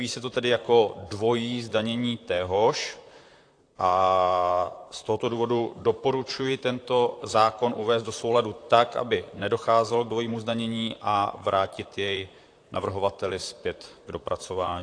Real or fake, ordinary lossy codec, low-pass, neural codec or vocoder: fake; AAC, 48 kbps; 9.9 kHz; vocoder, 44.1 kHz, 128 mel bands, Pupu-Vocoder